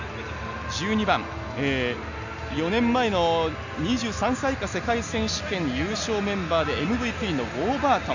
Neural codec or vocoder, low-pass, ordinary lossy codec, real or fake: none; 7.2 kHz; none; real